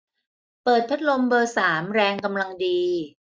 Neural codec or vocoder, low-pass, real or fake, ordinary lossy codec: none; none; real; none